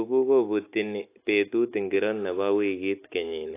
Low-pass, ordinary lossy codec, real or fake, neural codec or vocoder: 3.6 kHz; AAC, 24 kbps; real; none